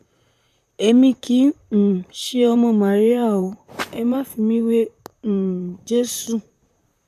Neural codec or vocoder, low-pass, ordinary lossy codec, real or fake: vocoder, 44.1 kHz, 128 mel bands, Pupu-Vocoder; 14.4 kHz; none; fake